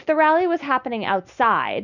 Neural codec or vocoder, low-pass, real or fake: none; 7.2 kHz; real